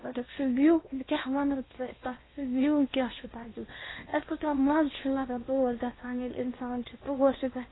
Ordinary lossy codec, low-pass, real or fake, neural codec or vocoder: AAC, 16 kbps; 7.2 kHz; fake; codec, 16 kHz in and 24 kHz out, 0.8 kbps, FocalCodec, streaming, 65536 codes